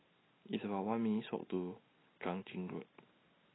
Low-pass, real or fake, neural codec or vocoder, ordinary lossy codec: 7.2 kHz; real; none; AAC, 16 kbps